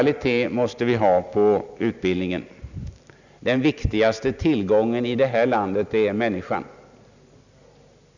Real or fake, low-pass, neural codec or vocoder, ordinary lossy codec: real; 7.2 kHz; none; none